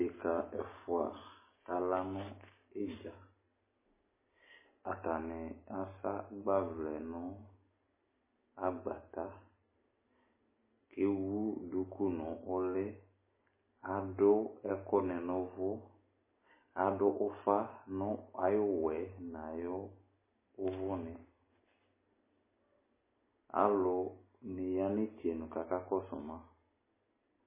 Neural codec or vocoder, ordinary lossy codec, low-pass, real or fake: none; MP3, 16 kbps; 3.6 kHz; real